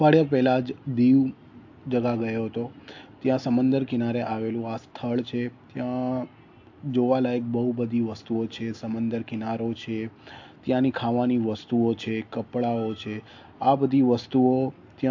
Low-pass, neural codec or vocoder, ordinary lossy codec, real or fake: 7.2 kHz; none; AAC, 48 kbps; real